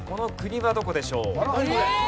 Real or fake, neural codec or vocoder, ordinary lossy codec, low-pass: real; none; none; none